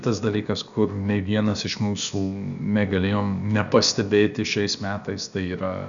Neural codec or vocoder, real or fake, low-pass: codec, 16 kHz, about 1 kbps, DyCAST, with the encoder's durations; fake; 7.2 kHz